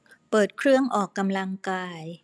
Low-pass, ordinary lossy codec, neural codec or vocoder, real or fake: none; none; none; real